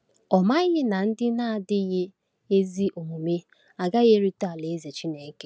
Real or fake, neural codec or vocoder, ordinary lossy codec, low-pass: real; none; none; none